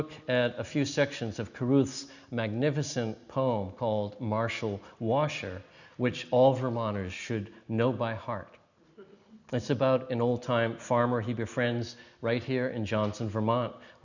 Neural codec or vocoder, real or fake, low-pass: none; real; 7.2 kHz